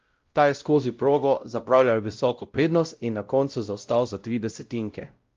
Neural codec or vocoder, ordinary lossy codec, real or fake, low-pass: codec, 16 kHz, 0.5 kbps, X-Codec, WavLM features, trained on Multilingual LibriSpeech; Opus, 32 kbps; fake; 7.2 kHz